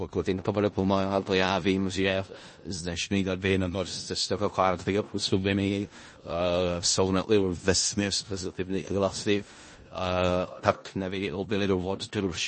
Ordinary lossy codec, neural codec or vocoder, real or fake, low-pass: MP3, 32 kbps; codec, 16 kHz in and 24 kHz out, 0.4 kbps, LongCat-Audio-Codec, four codebook decoder; fake; 10.8 kHz